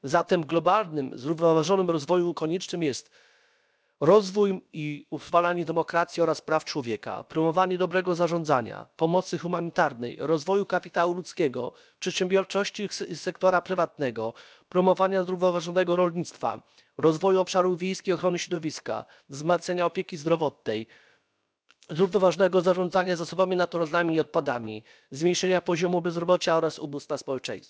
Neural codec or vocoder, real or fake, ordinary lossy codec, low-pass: codec, 16 kHz, about 1 kbps, DyCAST, with the encoder's durations; fake; none; none